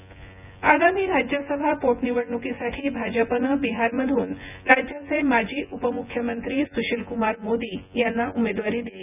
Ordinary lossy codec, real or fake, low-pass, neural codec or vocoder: none; fake; 3.6 kHz; vocoder, 24 kHz, 100 mel bands, Vocos